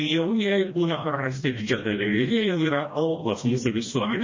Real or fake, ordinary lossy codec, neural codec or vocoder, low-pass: fake; MP3, 32 kbps; codec, 16 kHz, 1 kbps, FreqCodec, smaller model; 7.2 kHz